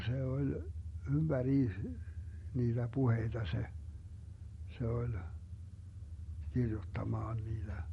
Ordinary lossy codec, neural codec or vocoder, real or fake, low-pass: MP3, 48 kbps; none; real; 19.8 kHz